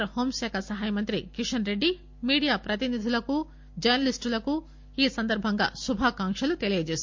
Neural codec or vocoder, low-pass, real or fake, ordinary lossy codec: none; 7.2 kHz; real; MP3, 48 kbps